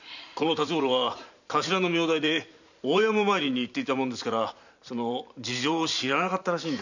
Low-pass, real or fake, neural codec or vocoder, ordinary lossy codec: 7.2 kHz; fake; vocoder, 44.1 kHz, 128 mel bands every 512 samples, BigVGAN v2; none